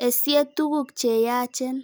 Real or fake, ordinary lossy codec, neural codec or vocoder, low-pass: real; none; none; none